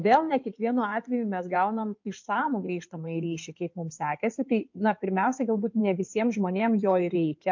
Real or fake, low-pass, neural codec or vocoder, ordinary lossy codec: fake; 7.2 kHz; codec, 16 kHz in and 24 kHz out, 2.2 kbps, FireRedTTS-2 codec; MP3, 48 kbps